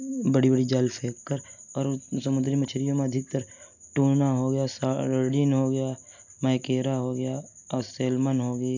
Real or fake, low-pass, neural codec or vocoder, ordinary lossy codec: real; 7.2 kHz; none; none